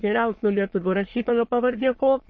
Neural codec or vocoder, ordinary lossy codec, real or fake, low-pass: autoencoder, 22.05 kHz, a latent of 192 numbers a frame, VITS, trained on many speakers; MP3, 32 kbps; fake; 7.2 kHz